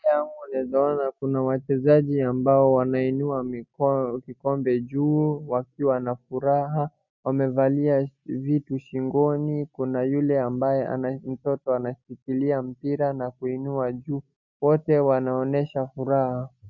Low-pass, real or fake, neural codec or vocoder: 7.2 kHz; real; none